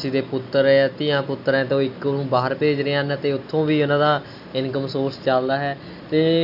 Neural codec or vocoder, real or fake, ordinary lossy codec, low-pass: none; real; none; 5.4 kHz